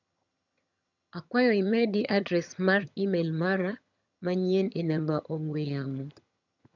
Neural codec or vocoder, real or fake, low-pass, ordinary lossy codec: vocoder, 22.05 kHz, 80 mel bands, HiFi-GAN; fake; 7.2 kHz; none